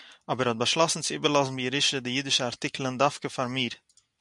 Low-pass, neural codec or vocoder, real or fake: 10.8 kHz; none; real